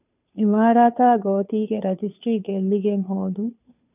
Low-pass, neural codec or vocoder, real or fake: 3.6 kHz; codec, 16 kHz, 2 kbps, FunCodec, trained on Chinese and English, 25 frames a second; fake